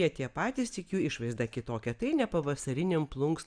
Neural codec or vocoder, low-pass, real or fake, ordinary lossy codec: none; 9.9 kHz; real; Opus, 64 kbps